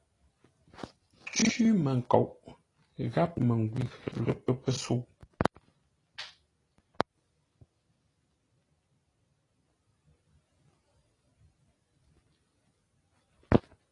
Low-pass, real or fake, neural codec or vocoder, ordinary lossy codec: 10.8 kHz; real; none; AAC, 32 kbps